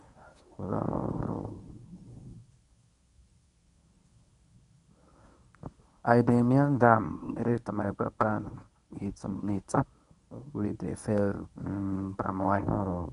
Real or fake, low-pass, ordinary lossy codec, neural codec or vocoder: fake; 10.8 kHz; none; codec, 24 kHz, 0.9 kbps, WavTokenizer, medium speech release version 1